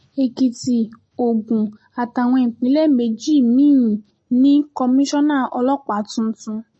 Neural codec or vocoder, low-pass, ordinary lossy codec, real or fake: none; 9.9 kHz; MP3, 32 kbps; real